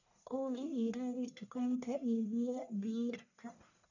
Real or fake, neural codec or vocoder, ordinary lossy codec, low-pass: fake; codec, 44.1 kHz, 1.7 kbps, Pupu-Codec; none; 7.2 kHz